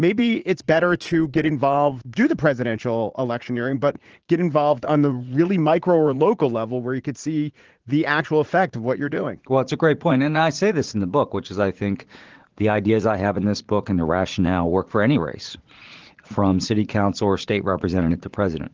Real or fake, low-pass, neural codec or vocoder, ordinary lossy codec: fake; 7.2 kHz; vocoder, 44.1 kHz, 80 mel bands, Vocos; Opus, 16 kbps